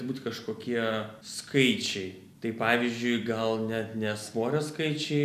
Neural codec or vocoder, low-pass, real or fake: none; 14.4 kHz; real